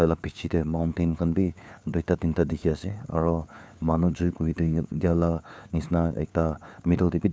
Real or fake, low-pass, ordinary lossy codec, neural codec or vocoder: fake; none; none; codec, 16 kHz, 4 kbps, FunCodec, trained on LibriTTS, 50 frames a second